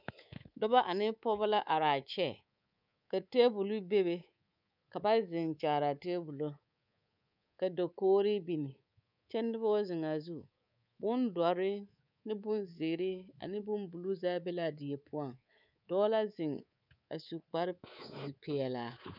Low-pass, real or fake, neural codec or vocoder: 5.4 kHz; fake; codec, 24 kHz, 3.1 kbps, DualCodec